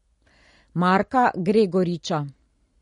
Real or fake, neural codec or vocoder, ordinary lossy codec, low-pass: real; none; MP3, 48 kbps; 10.8 kHz